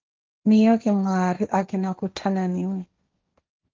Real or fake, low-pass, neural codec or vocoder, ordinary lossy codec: fake; 7.2 kHz; codec, 16 kHz, 1.1 kbps, Voila-Tokenizer; Opus, 24 kbps